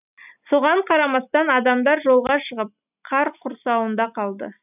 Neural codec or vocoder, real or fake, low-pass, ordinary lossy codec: none; real; 3.6 kHz; none